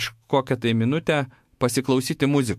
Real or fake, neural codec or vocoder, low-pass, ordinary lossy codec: fake; autoencoder, 48 kHz, 128 numbers a frame, DAC-VAE, trained on Japanese speech; 14.4 kHz; MP3, 64 kbps